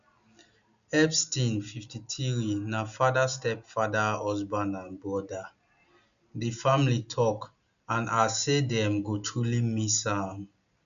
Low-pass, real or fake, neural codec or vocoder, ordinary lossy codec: 7.2 kHz; real; none; none